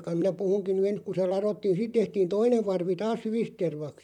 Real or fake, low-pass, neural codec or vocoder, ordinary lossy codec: fake; 19.8 kHz; vocoder, 44.1 kHz, 128 mel bands, Pupu-Vocoder; none